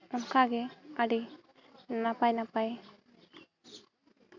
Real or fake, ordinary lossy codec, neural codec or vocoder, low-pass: real; MP3, 64 kbps; none; 7.2 kHz